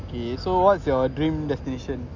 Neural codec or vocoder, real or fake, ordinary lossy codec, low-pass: none; real; none; 7.2 kHz